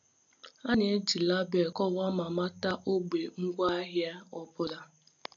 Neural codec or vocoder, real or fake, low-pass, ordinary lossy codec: none; real; 7.2 kHz; AAC, 64 kbps